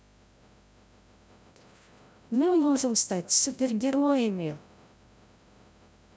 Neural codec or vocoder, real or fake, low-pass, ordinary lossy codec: codec, 16 kHz, 0.5 kbps, FreqCodec, larger model; fake; none; none